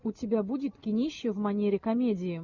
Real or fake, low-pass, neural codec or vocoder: real; 7.2 kHz; none